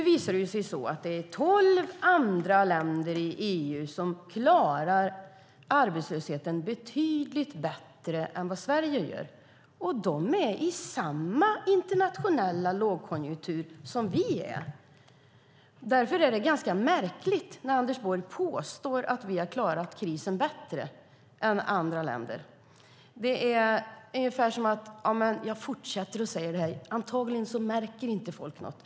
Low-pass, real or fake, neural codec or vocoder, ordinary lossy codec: none; real; none; none